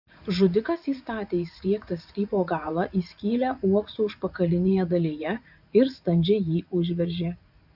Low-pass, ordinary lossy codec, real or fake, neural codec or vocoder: 5.4 kHz; AAC, 48 kbps; fake; vocoder, 44.1 kHz, 80 mel bands, Vocos